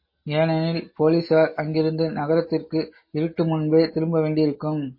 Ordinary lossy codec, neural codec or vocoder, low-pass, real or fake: MP3, 24 kbps; none; 5.4 kHz; real